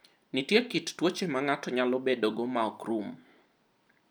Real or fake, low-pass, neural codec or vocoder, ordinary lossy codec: real; none; none; none